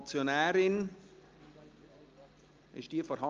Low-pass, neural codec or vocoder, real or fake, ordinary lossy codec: 7.2 kHz; none; real; Opus, 24 kbps